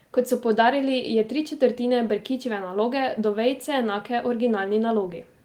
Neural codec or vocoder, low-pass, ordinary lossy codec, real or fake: none; 19.8 kHz; Opus, 24 kbps; real